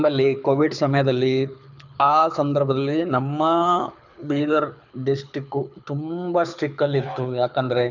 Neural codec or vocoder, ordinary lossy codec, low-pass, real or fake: codec, 24 kHz, 6 kbps, HILCodec; none; 7.2 kHz; fake